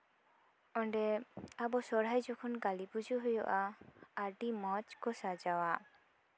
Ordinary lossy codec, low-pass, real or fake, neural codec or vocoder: none; none; real; none